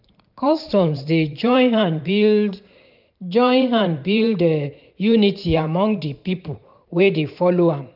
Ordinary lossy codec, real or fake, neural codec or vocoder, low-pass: none; fake; vocoder, 44.1 kHz, 128 mel bands every 512 samples, BigVGAN v2; 5.4 kHz